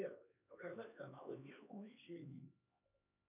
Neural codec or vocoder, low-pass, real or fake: codec, 16 kHz, 2 kbps, X-Codec, HuBERT features, trained on LibriSpeech; 3.6 kHz; fake